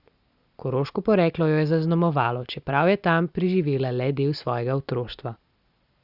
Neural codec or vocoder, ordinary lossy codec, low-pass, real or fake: none; Opus, 64 kbps; 5.4 kHz; real